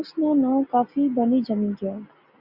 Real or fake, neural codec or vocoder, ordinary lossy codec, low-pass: real; none; Opus, 64 kbps; 5.4 kHz